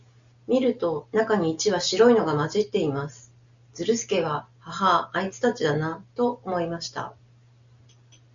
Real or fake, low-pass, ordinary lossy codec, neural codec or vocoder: real; 7.2 kHz; Opus, 64 kbps; none